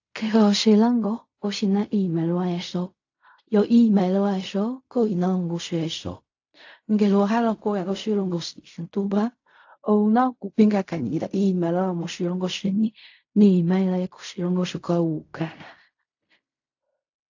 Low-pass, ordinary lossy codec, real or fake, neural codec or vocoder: 7.2 kHz; AAC, 48 kbps; fake; codec, 16 kHz in and 24 kHz out, 0.4 kbps, LongCat-Audio-Codec, fine tuned four codebook decoder